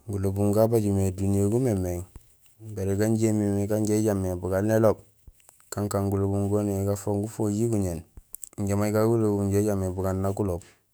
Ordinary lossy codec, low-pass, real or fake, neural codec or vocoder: none; none; fake; autoencoder, 48 kHz, 128 numbers a frame, DAC-VAE, trained on Japanese speech